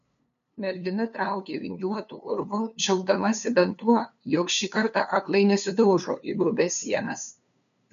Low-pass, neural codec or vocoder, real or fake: 7.2 kHz; codec, 16 kHz, 2 kbps, FunCodec, trained on LibriTTS, 25 frames a second; fake